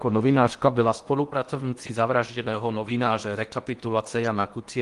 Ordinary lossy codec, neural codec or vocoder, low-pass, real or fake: Opus, 24 kbps; codec, 16 kHz in and 24 kHz out, 0.8 kbps, FocalCodec, streaming, 65536 codes; 10.8 kHz; fake